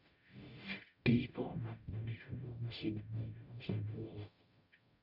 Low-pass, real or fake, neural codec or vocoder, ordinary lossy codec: 5.4 kHz; fake; codec, 44.1 kHz, 0.9 kbps, DAC; none